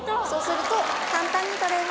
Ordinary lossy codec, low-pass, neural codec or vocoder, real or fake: none; none; none; real